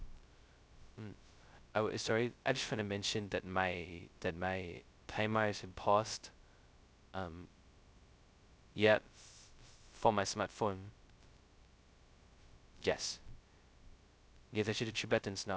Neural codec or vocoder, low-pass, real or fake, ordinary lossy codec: codec, 16 kHz, 0.2 kbps, FocalCodec; none; fake; none